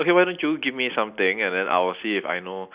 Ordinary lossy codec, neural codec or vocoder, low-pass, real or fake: Opus, 64 kbps; none; 3.6 kHz; real